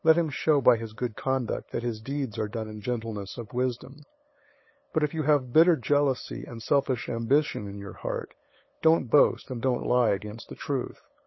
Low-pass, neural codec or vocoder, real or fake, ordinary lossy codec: 7.2 kHz; codec, 16 kHz, 8 kbps, FunCodec, trained on LibriTTS, 25 frames a second; fake; MP3, 24 kbps